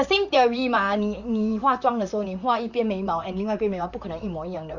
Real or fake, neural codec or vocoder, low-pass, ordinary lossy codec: fake; vocoder, 44.1 kHz, 128 mel bands, Pupu-Vocoder; 7.2 kHz; none